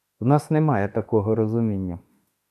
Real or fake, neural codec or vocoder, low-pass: fake; autoencoder, 48 kHz, 32 numbers a frame, DAC-VAE, trained on Japanese speech; 14.4 kHz